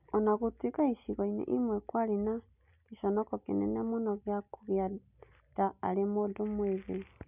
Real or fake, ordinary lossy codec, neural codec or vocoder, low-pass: real; none; none; 3.6 kHz